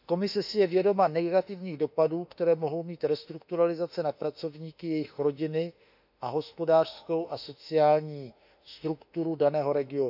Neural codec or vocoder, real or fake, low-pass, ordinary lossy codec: autoencoder, 48 kHz, 32 numbers a frame, DAC-VAE, trained on Japanese speech; fake; 5.4 kHz; none